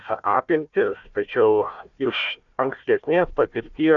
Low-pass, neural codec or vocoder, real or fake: 7.2 kHz; codec, 16 kHz, 1 kbps, FunCodec, trained on Chinese and English, 50 frames a second; fake